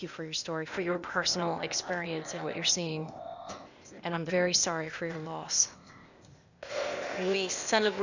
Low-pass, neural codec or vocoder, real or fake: 7.2 kHz; codec, 16 kHz, 0.8 kbps, ZipCodec; fake